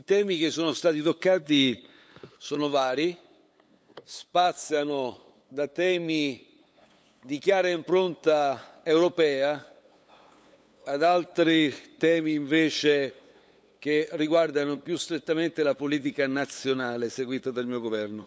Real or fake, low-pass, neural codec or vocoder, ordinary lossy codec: fake; none; codec, 16 kHz, 8 kbps, FunCodec, trained on LibriTTS, 25 frames a second; none